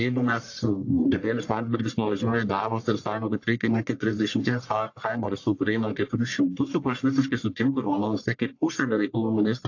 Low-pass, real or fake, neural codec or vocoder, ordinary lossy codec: 7.2 kHz; fake; codec, 44.1 kHz, 1.7 kbps, Pupu-Codec; AAC, 48 kbps